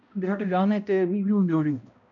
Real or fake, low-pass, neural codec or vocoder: fake; 7.2 kHz; codec, 16 kHz, 0.5 kbps, X-Codec, HuBERT features, trained on balanced general audio